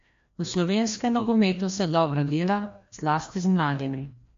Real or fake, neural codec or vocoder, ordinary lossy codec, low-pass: fake; codec, 16 kHz, 1 kbps, FreqCodec, larger model; MP3, 48 kbps; 7.2 kHz